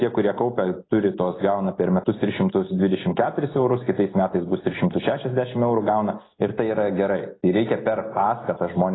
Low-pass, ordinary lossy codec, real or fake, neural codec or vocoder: 7.2 kHz; AAC, 16 kbps; real; none